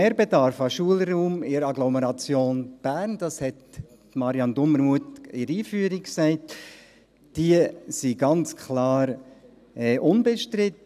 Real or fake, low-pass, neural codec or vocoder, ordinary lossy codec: real; 14.4 kHz; none; none